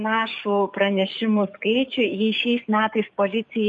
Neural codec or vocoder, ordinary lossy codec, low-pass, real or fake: codec, 44.1 kHz, 7.8 kbps, DAC; MP3, 48 kbps; 10.8 kHz; fake